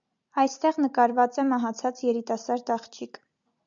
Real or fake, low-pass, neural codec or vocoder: real; 7.2 kHz; none